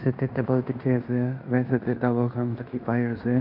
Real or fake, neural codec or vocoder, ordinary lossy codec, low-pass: fake; codec, 16 kHz in and 24 kHz out, 0.9 kbps, LongCat-Audio-Codec, fine tuned four codebook decoder; none; 5.4 kHz